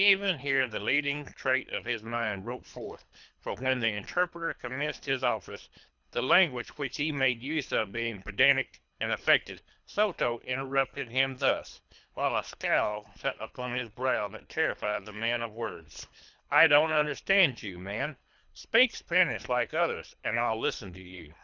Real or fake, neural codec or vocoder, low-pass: fake; codec, 24 kHz, 3 kbps, HILCodec; 7.2 kHz